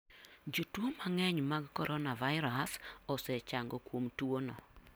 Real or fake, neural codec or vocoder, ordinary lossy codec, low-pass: real; none; none; none